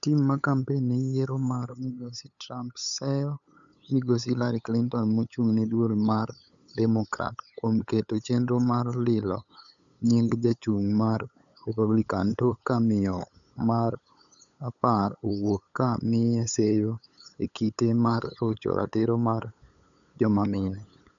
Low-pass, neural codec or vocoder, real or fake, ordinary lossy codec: 7.2 kHz; codec, 16 kHz, 8 kbps, FunCodec, trained on LibriTTS, 25 frames a second; fake; none